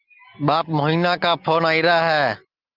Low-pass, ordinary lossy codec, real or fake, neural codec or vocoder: 5.4 kHz; Opus, 32 kbps; real; none